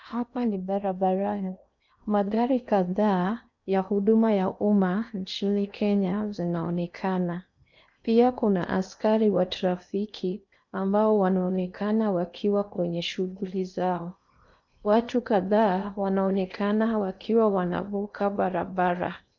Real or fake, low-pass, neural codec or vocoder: fake; 7.2 kHz; codec, 16 kHz in and 24 kHz out, 0.8 kbps, FocalCodec, streaming, 65536 codes